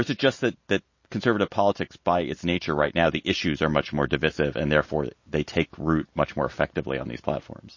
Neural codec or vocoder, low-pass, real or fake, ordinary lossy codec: none; 7.2 kHz; real; MP3, 32 kbps